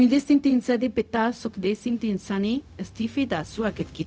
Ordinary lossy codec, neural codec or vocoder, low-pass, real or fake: none; codec, 16 kHz, 0.4 kbps, LongCat-Audio-Codec; none; fake